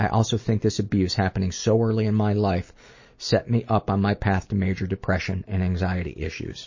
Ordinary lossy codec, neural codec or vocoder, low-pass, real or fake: MP3, 32 kbps; none; 7.2 kHz; real